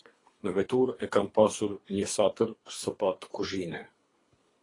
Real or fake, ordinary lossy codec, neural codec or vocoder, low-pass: fake; AAC, 32 kbps; codec, 24 kHz, 3 kbps, HILCodec; 10.8 kHz